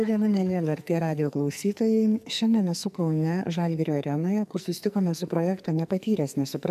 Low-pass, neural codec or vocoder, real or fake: 14.4 kHz; codec, 44.1 kHz, 2.6 kbps, SNAC; fake